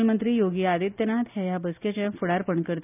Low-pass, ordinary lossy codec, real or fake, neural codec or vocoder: 3.6 kHz; none; real; none